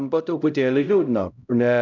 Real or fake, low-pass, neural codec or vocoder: fake; 7.2 kHz; codec, 16 kHz, 0.5 kbps, X-Codec, HuBERT features, trained on LibriSpeech